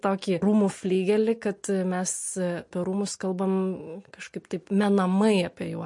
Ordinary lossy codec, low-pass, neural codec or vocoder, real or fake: MP3, 48 kbps; 10.8 kHz; none; real